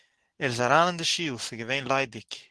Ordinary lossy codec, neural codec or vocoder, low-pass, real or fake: Opus, 16 kbps; none; 10.8 kHz; real